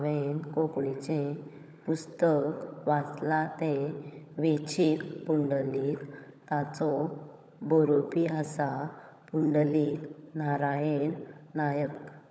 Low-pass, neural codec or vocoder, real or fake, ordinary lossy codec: none; codec, 16 kHz, 16 kbps, FunCodec, trained on LibriTTS, 50 frames a second; fake; none